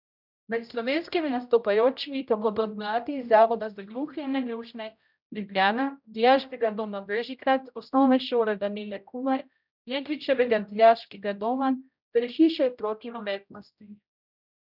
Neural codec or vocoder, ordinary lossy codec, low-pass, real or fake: codec, 16 kHz, 0.5 kbps, X-Codec, HuBERT features, trained on general audio; none; 5.4 kHz; fake